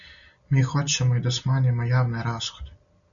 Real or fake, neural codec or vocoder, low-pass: real; none; 7.2 kHz